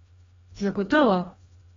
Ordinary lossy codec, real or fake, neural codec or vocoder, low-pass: AAC, 24 kbps; fake; codec, 16 kHz, 1 kbps, FreqCodec, larger model; 7.2 kHz